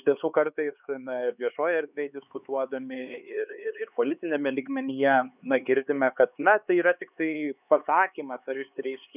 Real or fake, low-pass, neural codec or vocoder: fake; 3.6 kHz; codec, 16 kHz, 4 kbps, X-Codec, HuBERT features, trained on LibriSpeech